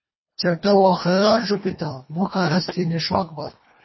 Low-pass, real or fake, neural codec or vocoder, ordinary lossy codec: 7.2 kHz; fake; codec, 24 kHz, 1.5 kbps, HILCodec; MP3, 24 kbps